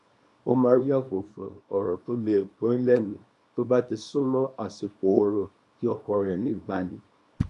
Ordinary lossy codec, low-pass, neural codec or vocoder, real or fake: none; 10.8 kHz; codec, 24 kHz, 0.9 kbps, WavTokenizer, small release; fake